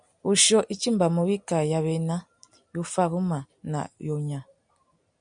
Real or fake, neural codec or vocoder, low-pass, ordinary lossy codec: real; none; 9.9 kHz; MP3, 96 kbps